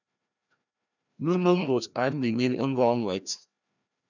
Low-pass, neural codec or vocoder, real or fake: 7.2 kHz; codec, 16 kHz, 1 kbps, FreqCodec, larger model; fake